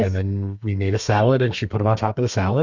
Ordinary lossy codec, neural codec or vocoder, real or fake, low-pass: Opus, 64 kbps; codec, 32 kHz, 1.9 kbps, SNAC; fake; 7.2 kHz